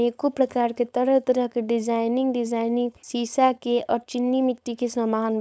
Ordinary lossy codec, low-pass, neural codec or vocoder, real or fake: none; none; codec, 16 kHz, 4.8 kbps, FACodec; fake